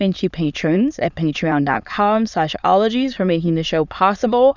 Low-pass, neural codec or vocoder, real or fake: 7.2 kHz; autoencoder, 22.05 kHz, a latent of 192 numbers a frame, VITS, trained on many speakers; fake